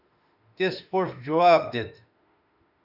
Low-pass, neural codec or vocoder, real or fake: 5.4 kHz; autoencoder, 48 kHz, 32 numbers a frame, DAC-VAE, trained on Japanese speech; fake